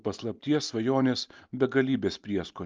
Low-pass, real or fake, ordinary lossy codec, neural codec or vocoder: 7.2 kHz; real; Opus, 24 kbps; none